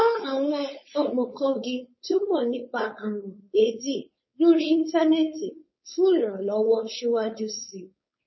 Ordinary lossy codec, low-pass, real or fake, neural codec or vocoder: MP3, 24 kbps; 7.2 kHz; fake; codec, 16 kHz, 4.8 kbps, FACodec